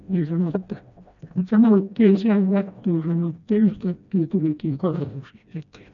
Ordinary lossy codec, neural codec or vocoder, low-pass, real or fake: none; codec, 16 kHz, 1 kbps, FreqCodec, smaller model; 7.2 kHz; fake